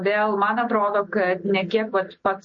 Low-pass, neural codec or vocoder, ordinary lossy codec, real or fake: 7.2 kHz; none; MP3, 32 kbps; real